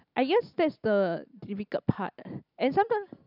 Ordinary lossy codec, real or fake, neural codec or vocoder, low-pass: none; real; none; 5.4 kHz